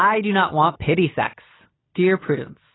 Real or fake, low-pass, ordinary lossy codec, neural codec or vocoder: real; 7.2 kHz; AAC, 16 kbps; none